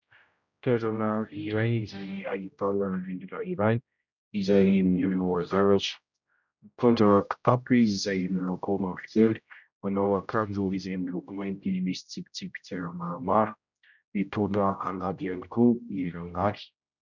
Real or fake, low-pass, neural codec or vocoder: fake; 7.2 kHz; codec, 16 kHz, 0.5 kbps, X-Codec, HuBERT features, trained on general audio